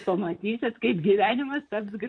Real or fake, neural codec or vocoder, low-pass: fake; vocoder, 44.1 kHz, 128 mel bands, Pupu-Vocoder; 9.9 kHz